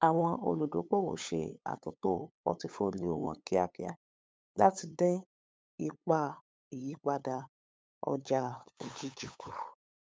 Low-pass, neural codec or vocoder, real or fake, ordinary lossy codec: none; codec, 16 kHz, 8 kbps, FunCodec, trained on LibriTTS, 25 frames a second; fake; none